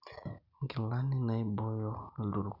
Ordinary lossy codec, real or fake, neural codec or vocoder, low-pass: none; real; none; 5.4 kHz